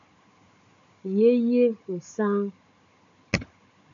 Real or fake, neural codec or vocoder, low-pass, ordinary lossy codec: fake; codec, 16 kHz, 16 kbps, FunCodec, trained on Chinese and English, 50 frames a second; 7.2 kHz; MP3, 64 kbps